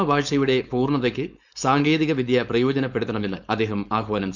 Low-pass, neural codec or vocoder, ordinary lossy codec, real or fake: 7.2 kHz; codec, 16 kHz, 4.8 kbps, FACodec; none; fake